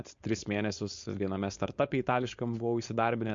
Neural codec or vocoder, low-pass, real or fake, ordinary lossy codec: codec, 16 kHz, 4.8 kbps, FACodec; 7.2 kHz; fake; MP3, 48 kbps